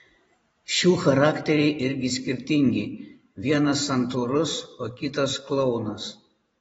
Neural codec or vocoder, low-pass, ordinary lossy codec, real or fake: vocoder, 44.1 kHz, 128 mel bands every 512 samples, BigVGAN v2; 19.8 kHz; AAC, 24 kbps; fake